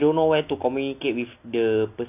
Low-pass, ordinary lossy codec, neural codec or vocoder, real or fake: 3.6 kHz; none; none; real